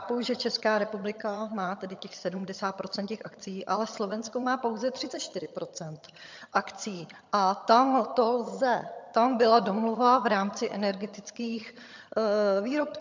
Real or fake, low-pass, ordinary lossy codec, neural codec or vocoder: fake; 7.2 kHz; MP3, 64 kbps; vocoder, 22.05 kHz, 80 mel bands, HiFi-GAN